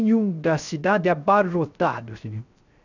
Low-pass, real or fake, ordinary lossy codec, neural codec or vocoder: 7.2 kHz; fake; none; codec, 16 kHz, 0.3 kbps, FocalCodec